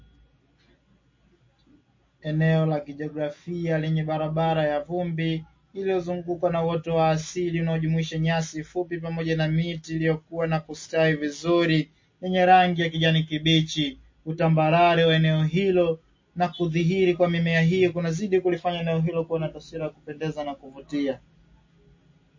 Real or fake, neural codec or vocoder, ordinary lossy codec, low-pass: real; none; MP3, 32 kbps; 7.2 kHz